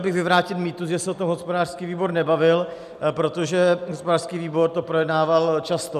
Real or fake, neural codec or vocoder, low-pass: real; none; 14.4 kHz